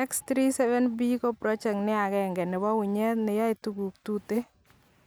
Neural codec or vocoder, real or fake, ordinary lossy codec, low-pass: none; real; none; none